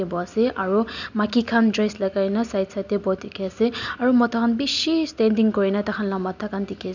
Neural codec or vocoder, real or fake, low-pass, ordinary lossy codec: none; real; 7.2 kHz; none